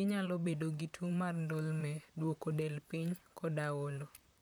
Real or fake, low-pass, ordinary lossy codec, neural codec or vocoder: fake; none; none; vocoder, 44.1 kHz, 128 mel bands, Pupu-Vocoder